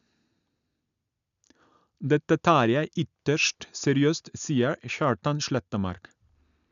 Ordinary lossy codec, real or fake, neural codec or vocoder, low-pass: none; real; none; 7.2 kHz